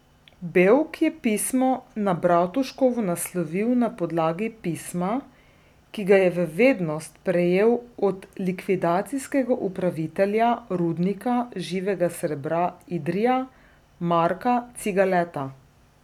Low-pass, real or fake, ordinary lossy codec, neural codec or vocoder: 19.8 kHz; real; none; none